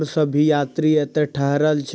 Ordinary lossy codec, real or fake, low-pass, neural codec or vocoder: none; real; none; none